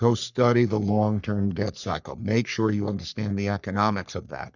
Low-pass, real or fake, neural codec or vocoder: 7.2 kHz; fake; codec, 44.1 kHz, 3.4 kbps, Pupu-Codec